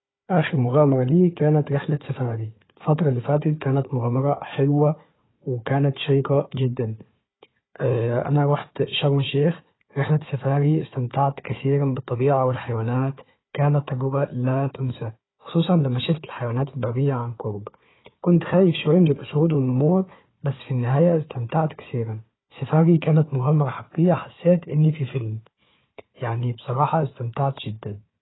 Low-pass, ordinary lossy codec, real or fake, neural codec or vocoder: 7.2 kHz; AAC, 16 kbps; fake; codec, 16 kHz, 4 kbps, FunCodec, trained on Chinese and English, 50 frames a second